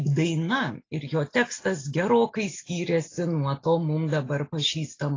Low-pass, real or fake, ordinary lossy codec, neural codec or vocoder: 7.2 kHz; real; AAC, 32 kbps; none